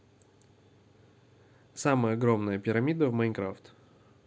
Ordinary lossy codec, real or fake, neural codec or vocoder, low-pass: none; real; none; none